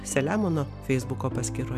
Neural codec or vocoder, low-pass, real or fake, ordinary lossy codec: none; 14.4 kHz; real; MP3, 96 kbps